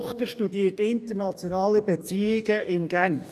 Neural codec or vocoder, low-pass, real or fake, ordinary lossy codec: codec, 44.1 kHz, 2.6 kbps, DAC; 14.4 kHz; fake; none